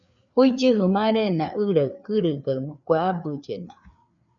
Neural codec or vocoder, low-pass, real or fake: codec, 16 kHz, 4 kbps, FreqCodec, larger model; 7.2 kHz; fake